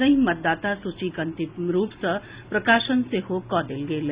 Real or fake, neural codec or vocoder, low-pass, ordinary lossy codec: real; none; 3.6 kHz; Opus, 64 kbps